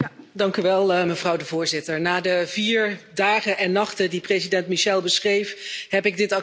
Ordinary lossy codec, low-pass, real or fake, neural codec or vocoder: none; none; real; none